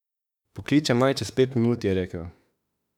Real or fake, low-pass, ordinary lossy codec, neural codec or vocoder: fake; 19.8 kHz; none; autoencoder, 48 kHz, 32 numbers a frame, DAC-VAE, trained on Japanese speech